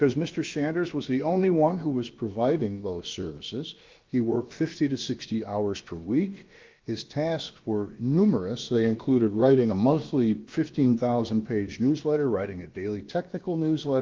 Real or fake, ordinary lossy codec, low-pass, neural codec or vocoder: fake; Opus, 32 kbps; 7.2 kHz; codec, 16 kHz, about 1 kbps, DyCAST, with the encoder's durations